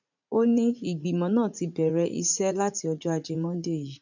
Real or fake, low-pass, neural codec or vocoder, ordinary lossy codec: fake; 7.2 kHz; vocoder, 44.1 kHz, 80 mel bands, Vocos; none